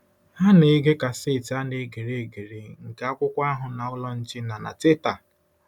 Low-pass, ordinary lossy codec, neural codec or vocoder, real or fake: 19.8 kHz; none; none; real